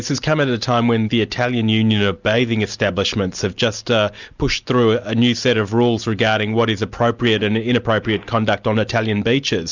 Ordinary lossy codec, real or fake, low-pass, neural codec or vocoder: Opus, 64 kbps; real; 7.2 kHz; none